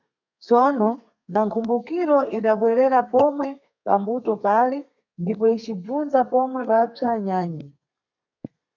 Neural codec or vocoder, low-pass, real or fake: codec, 32 kHz, 1.9 kbps, SNAC; 7.2 kHz; fake